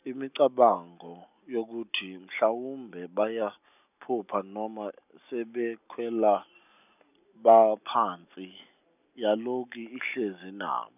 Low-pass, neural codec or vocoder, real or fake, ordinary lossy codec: 3.6 kHz; none; real; none